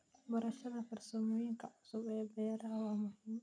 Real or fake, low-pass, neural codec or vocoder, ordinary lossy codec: real; 9.9 kHz; none; none